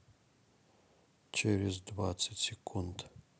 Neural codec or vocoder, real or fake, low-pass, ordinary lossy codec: none; real; none; none